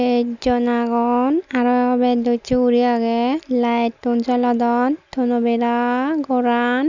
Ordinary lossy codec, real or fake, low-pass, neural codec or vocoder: none; real; 7.2 kHz; none